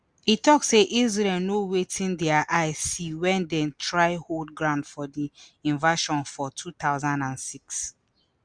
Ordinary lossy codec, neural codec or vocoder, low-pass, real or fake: Opus, 64 kbps; none; 9.9 kHz; real